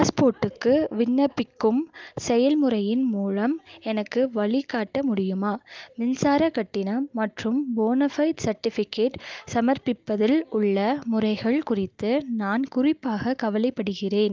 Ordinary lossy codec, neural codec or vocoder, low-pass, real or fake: Opus, 32 kbps; none; 7.2 kHz; real